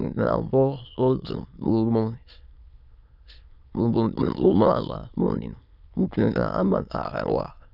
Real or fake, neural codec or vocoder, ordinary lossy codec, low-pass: fake; autoencoder, 22.05 kHz, a latent of 192 numbers a frame, VITS, trained on many speakers; none; 5.4 kHz